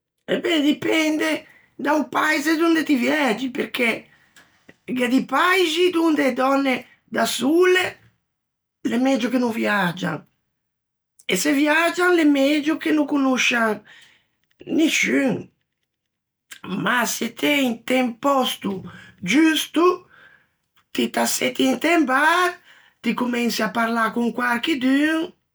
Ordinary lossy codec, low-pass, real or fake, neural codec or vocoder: none; none; real; none